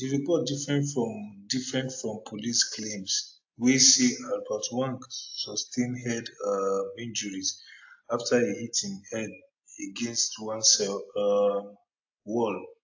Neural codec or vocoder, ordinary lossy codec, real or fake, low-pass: none; AAC, 48 kbps; real; 7.2 kHz